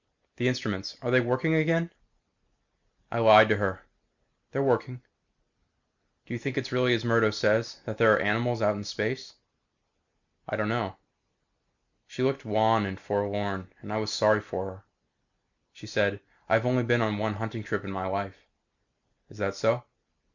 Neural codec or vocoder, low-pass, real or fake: none; 7.2 kHz; real